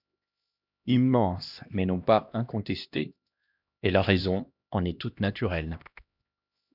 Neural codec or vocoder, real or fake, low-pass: codec, 16 kHz, 1 kbps, X-Codec, HuBERT features, trained on LibriSpeech; fake; 5.4 kHz